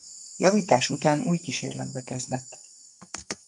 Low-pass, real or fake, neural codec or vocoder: 10.8 kHz; fake; codec, 44.1 kHz, 2.6 kbps, SNAC